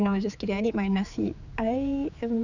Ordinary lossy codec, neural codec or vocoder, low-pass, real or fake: none; codec, 16 kHz, 4 kbps, X-Codec, HuBERT features, trained on general audio; 7.2 kHz; fake